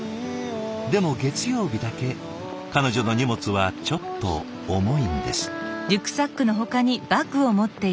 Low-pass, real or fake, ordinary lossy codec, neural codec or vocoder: none; real; none; none